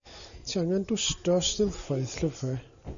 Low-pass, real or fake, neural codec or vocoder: 7.2 kHz; real; none